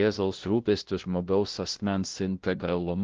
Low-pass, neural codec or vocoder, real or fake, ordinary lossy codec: 7.2 kHz; codec, 16 kHz, 0.5 kbps, FunCodec, trained on LibriTTS, 25 frames a second; fake; Opus, 16 kbps